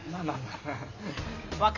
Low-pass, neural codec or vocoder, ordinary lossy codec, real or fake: 7.2 kHz; none; none; real